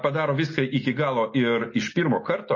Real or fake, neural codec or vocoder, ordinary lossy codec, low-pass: real; none; MP3, 32 kbps; 7.2 kHz